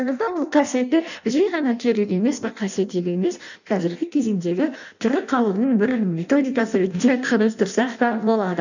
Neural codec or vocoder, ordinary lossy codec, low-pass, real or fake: codec, 16 kHz in and 24 kHz out, 0.6 kbps, FireRedTTS-2 codec; none; 7.2 kHz; fake